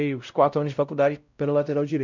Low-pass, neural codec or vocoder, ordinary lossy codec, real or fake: 7.2 kHz; codec, 16 kHz, 0.5 kbps, X-Codec, WavLM features, trained on Multilingual LibriSpeech; none; fake